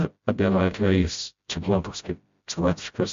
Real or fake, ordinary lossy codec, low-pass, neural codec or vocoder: fake; MP3, 48 kbps; 7.2 kHz; codec, 16 kHz, 0.5 kbps, FreqCodec, smaller model